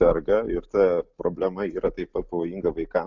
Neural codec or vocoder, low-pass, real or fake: none; 7.2 kHz; real